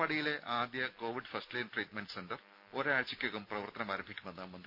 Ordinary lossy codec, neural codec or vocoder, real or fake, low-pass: none; none; real; 5.4 kHz